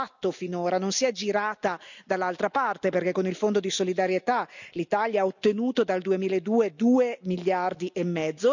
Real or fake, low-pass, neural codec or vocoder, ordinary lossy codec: real; 7.2 kHz; none; none